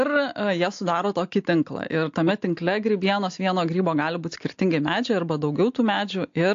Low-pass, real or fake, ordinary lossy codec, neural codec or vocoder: 7.2 kHz; real; AAC, 48 kbps; none